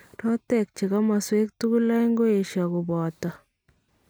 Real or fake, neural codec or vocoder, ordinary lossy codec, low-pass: real; none; none; none